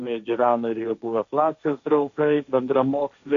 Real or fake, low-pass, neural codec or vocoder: fake; 7.2 kHz; codec, 16 kHz, 1.1 kbps, Voila-Tokenizer